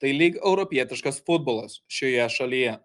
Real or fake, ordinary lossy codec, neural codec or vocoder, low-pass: real; Opus, 32 kbps; none; 10.8 kHz